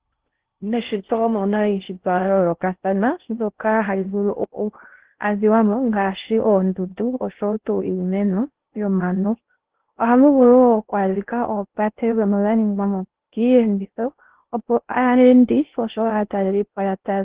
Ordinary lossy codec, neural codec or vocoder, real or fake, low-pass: Opus, 16 kbps; codec, 16 kHz in and 24 kHz out, 0.6 kbps, FocalCodec, streaming, 4096 codes; fake; 3.6 kHz